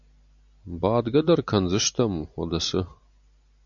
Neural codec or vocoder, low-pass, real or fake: none; 7.2 kHz; real